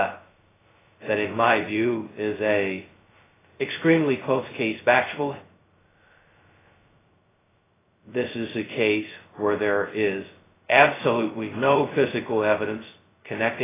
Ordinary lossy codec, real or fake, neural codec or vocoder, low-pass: AAC, 16 kbps; fake; codec, 16 kHz, 0.2 kbps, FocalCodec; 3.6 kHz